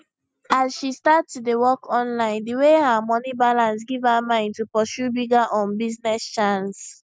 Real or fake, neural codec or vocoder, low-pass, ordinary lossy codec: real; none; none; none